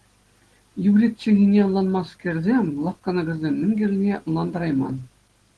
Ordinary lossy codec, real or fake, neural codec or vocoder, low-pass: Opus, 16 kbps; real; none; 10.8 kHz